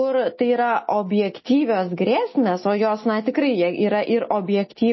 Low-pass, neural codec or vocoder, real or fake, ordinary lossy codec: 7.2 kHz; none; real; MP3, 24 kbps